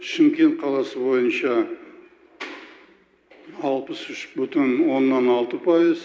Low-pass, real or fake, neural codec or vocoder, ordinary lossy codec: none; real; none; none